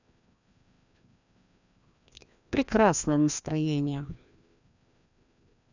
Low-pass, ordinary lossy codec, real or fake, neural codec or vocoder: 7.2 kHz; none; fake; codec, 16 kHz, 1 kbps, FreqCodec, larger model